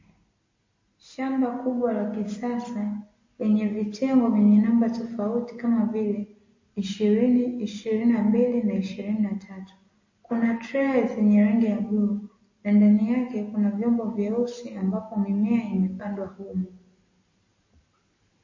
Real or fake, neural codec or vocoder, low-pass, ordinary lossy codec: real; none; 7.2 kHz; MP3, 32 kbps